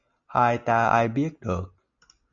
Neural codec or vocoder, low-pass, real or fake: none; 7.2 kHz; real